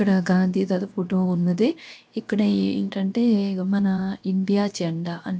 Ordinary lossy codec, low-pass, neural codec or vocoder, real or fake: none; none; codec, 16 kHz, 0.7 kbps, FocalCodec; fake